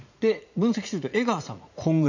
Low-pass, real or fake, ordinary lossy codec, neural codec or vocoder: 7.2 kHz; fake; none; vocoder, 44.1 kHz, 80 mel bands, Vocos